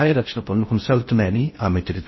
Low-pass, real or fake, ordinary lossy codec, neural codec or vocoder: 7.2 kHz; fake; MP3, 24 kbps; codec, 16 kHz in and 24 kHz out, 0.6 kbps, FocalCodec, streaming, 4096 codes